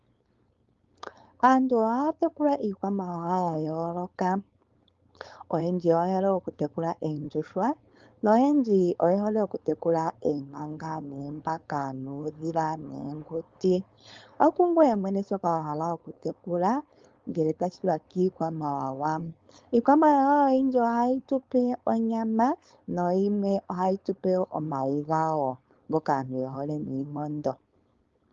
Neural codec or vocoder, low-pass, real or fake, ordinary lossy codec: codec, 16 kHz, 4.8 kbps, FACodec; 7.2 kHz; fake; Opus, 32 kbps